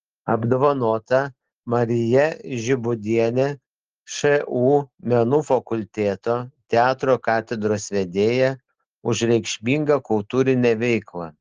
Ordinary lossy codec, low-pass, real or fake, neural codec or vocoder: Opus, 16 kbps; 7.2 kHz; real; none